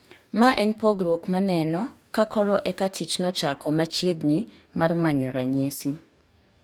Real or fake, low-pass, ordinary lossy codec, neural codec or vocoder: fake; none; none; codec, 44.1 kHz, 2.6 kbps, DAC